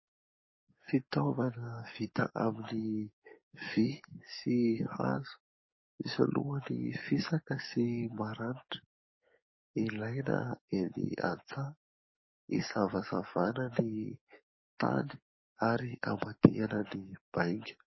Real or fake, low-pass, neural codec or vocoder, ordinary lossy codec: fake; 7.2 kHz; codec, 44.1 kHz, 7.8 kbps, DAC; MP3, 24 kbps